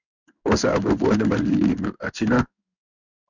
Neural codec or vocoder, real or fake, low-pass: codec, 16 kHz in and 24 kHz out, 1 kbps, XY-Tokenizer; fake; 7.2 kHz